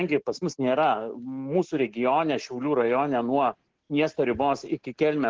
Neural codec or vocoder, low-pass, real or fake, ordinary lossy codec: none; 7.2 kHz; real; Opus, 16 kbps